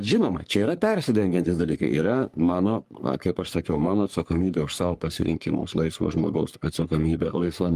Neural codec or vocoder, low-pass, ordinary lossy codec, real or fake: codec, 44.1 kHz, 3.4 kbps, Pupu-Codec; 14.4 kHz; Opus, 32 kbps; fake